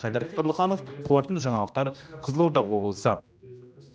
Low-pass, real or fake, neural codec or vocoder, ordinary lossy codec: none; fake; codec, 16 kHz, 1 kbps, X-Codec, HuBERT features, trained on general audio; none